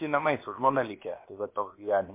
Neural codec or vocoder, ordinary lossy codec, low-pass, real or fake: codec, 16 kHz, about 1 kbps, DyCAST, with the encoder's durations; MP3, 24 kbps; 3.6 kHz; fake